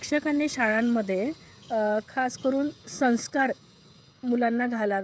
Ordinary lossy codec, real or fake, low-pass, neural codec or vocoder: none; fake; none; codec, 16 kHz, 16 kbps, FreqCodec, smaller model